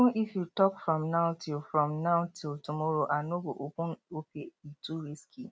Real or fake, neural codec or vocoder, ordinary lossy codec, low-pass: real; none; none; none